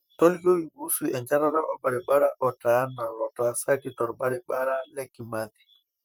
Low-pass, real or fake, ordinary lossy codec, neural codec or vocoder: none; fake; none; vocoder, 44.1 kHz, 128 mel bands, Pupu-Vocoder